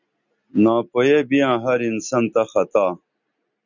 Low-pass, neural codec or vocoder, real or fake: 7.2 kHz; none; real